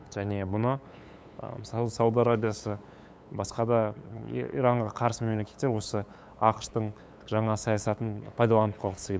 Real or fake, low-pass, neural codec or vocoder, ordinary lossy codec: fake; none; codec, 16 kHz, 8 kbps, FunCodec, trained on LibriTTS, 25 frames a second; none